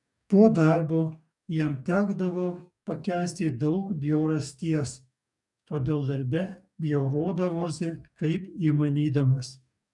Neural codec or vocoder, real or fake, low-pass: codec, 44.1 kHz, 2.6 kbps, DAC; fake; 10.8 kHz